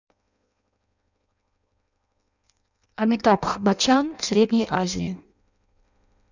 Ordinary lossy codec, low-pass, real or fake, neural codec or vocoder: none; 7.2 kHz; fake; codec, 16 kHz in and 24 kHz out, 0.6 kbps, FireRedTTS-2 codec